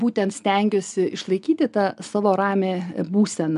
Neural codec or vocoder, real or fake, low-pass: none; real; 10.8 kHz